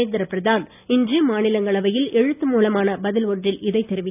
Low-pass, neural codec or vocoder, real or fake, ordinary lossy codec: 3.6 kHz; none; real; none